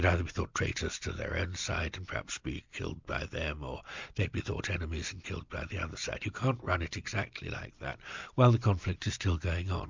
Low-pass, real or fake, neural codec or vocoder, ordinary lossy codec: 7.2 kHz; real; none; MP3, 64 kbps